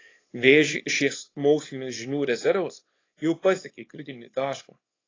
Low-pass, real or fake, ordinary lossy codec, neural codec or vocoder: 7.2 kHz; fake; AAC, 32 kbps; codec, 24 kHz, 0.9 kbps, WavTokenizer, small release